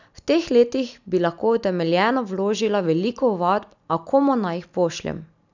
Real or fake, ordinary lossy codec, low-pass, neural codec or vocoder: real; none; 7.2 kHz; none